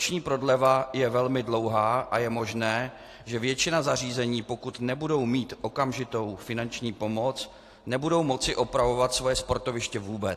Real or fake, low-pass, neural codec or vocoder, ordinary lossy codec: real; 14.4 kHz; none; AAC, 48 kbps